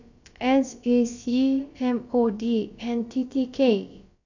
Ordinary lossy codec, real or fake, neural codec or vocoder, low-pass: none; fake; codec, 16 kHz, about 1 kbps, DyCAST, with the encoder's durations; 7.2 kHz